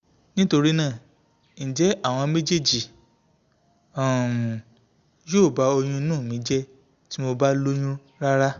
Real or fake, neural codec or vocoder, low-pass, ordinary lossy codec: real; none; 7.2 kHz; Opus, 64 kbps